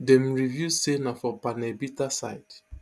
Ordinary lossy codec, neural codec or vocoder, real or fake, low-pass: none; none; real; none